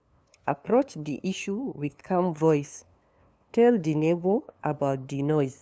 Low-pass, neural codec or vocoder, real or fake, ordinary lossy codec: none; codec, 16 kHz, 2 kbps, FunCodec, trained on LibriTTS, 25 frames a second; fake; none